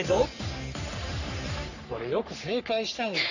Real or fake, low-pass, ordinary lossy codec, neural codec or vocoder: fake; 7.2 kHz; none; codec, 44.1 kHz, 3.4 kbps, Pupu-Codec